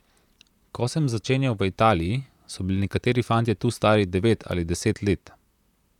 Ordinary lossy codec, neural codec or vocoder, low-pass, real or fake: none; vocoder, 44.1 kHz, 128 mel bands, Pupu-Vocoder; 19.8 kHz; fake